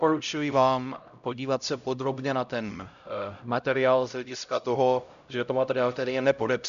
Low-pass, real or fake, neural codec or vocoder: 7.2 kHz; fake; codec, 16 kHz, 0.5 kbps, X-Codec, HuBERT features, trained on LibriSpeech